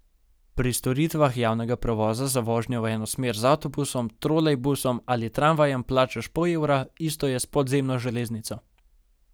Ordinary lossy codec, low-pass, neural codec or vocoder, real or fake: none; none; none; real